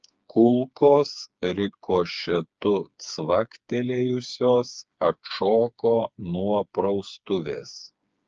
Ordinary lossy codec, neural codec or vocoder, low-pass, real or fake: Opus, 24 kbps; codec, 16 kHz, 4 kbps, FreqCodec, smaller model; 7.2 kHz; fake